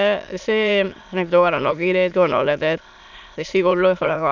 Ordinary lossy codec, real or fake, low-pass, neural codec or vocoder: none; fake; 7.2 kHz; autoencoder, 22.05 kHz, a latent of 192 numbers a frame, VITS, trained on many speakers